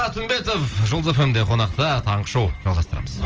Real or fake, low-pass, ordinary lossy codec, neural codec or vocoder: real; 7.2 kHz; Opus, 24 kbps; none